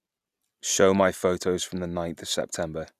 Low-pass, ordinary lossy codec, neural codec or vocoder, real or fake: 14.4 kHz; none; none; real